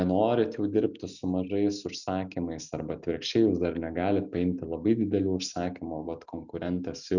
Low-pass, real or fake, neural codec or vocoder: 7.2 kHz; real; none